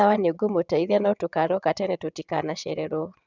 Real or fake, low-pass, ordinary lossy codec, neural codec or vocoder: fake; 7.2 kHz; none; vocoder, 22.05 kHz, 80 mel bands, WaveNeXt